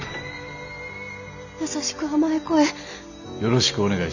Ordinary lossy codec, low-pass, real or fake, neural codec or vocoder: none; 7.2 kHz; real; none